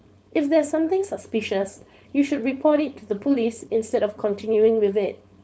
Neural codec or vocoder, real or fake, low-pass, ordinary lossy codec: codec, 16 kHz, 4.8 kbps, FACodec; fake; none; none